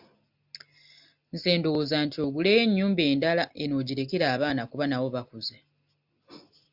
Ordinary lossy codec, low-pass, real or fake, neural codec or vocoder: AAC, 48 kbps; 5.4 kHz; real; none